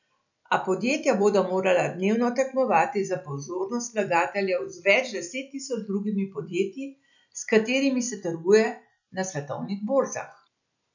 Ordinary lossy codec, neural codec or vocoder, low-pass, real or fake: none; none; 7.2 kHz; real